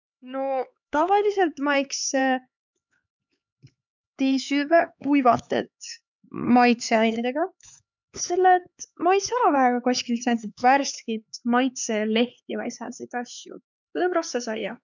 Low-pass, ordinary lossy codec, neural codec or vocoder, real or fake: 7.2 kHz; none; codec, 16 kHz, 4 kbps, X-Codec, HuBERT features, trained on LibriSpeech; fake